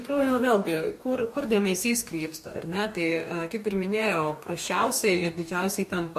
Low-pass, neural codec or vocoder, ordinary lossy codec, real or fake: 14.4 kHz; codec, 44.1 kHz, 2.6 kbps, DAC; MP3, 64 kbps; fake